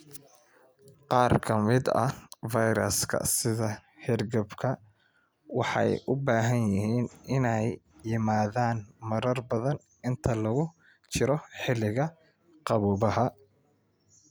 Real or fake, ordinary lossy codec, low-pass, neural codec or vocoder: real; none; none; none